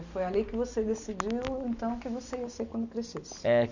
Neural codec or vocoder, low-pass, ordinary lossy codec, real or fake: none; 7.2 kHz; none; real